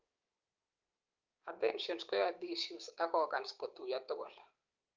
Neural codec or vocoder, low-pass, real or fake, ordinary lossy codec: codec, 16 kHz, 6 kbps, DAC; 7.2 kHz; fake; Opus, 24 kbps